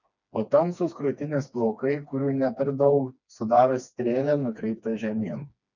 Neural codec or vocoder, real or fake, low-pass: codec, 16 kHz, 2 kbps, FreqCodec, smaller model; fake; 7.2 kHz